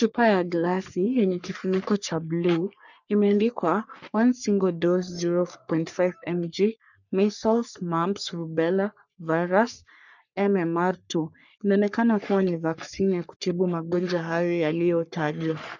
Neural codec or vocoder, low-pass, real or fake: codec, 44.1 kHz, 3.4 kbps, Pupu-Codec; 7.2 kHz; fake